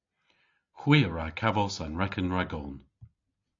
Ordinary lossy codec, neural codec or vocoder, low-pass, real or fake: AAC, 48 kbps; none; 7.2 kHz; real